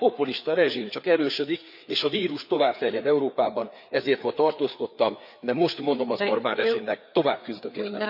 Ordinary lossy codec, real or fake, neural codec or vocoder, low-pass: none; fake; codec, 16 kHz, 4 kbps, FreqCodec, larger model; 5.4 kHz